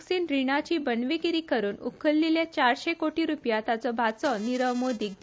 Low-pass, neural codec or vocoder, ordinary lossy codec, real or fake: none; none; none; real